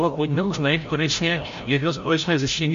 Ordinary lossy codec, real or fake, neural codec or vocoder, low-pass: MP3, 48 kbps; fake; codec, 16 kHz, 0.5 kbps, FreqCodec, larger model; 7.2 kHz